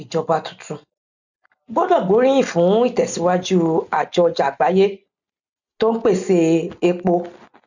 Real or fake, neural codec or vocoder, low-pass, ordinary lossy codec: real; none; 7.2 kHz; none